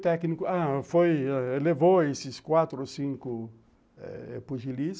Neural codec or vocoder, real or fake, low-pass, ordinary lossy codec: none; real; none; none